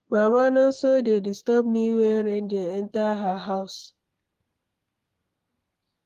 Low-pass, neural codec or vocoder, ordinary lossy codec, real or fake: 14.4 kHz; codec, 32 kHz, 1.9 kbps, SNAC; Opus, 24 kbps; fake